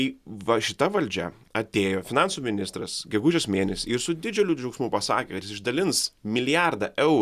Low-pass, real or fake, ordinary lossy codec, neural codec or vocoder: 14.4 kHz; real; Opus, 64 kbps; none